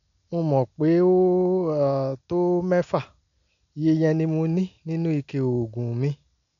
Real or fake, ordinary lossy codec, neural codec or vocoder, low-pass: real; none; none; 7.2 kHz